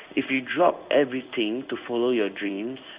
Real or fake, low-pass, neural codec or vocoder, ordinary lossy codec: fake; 3.6 kHz; codec, 16 kHz in and 24 kHz out, 1 kbps, XY-Tokenizer; Opus, 64 kbps